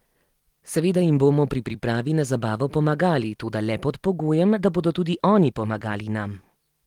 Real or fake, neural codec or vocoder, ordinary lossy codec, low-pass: fake; vocoder, 44.1 kHz, 128 mel bands every 256 samples, BigVGAN v2; Opus, 24 kbps; 19.8 kHz